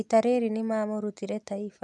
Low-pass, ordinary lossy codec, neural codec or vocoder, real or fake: none; none; none; real